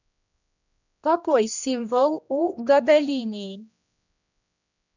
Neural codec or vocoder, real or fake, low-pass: codec, 16 kHz, 1 kbps, X-Codec, HuBERT features, trained on general audio; fake; 7.2 kHz